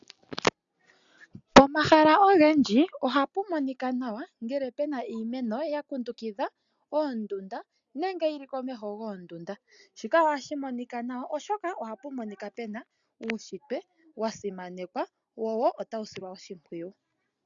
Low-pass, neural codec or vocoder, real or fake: 7.2 kHz; none; real